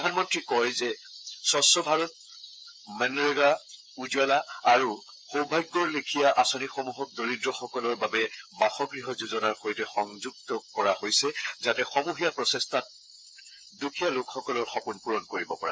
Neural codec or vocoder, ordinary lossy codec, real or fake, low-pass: codec, 16 kHz, 8 kbps, FreqCodec, smaller model; none; fake; none